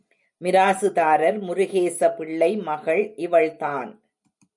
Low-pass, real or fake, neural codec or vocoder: 10.8 kHz; real; none